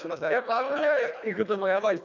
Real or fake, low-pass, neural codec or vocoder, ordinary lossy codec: fake; 7.2 kHz; codec, 24 kHz, 1.5 kbps, HILCodec; none